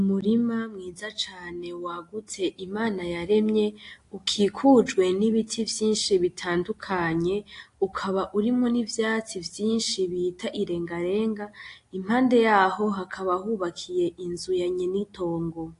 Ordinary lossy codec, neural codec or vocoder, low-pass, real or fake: AAC, 48 kbps; none; 10.8 kHz; real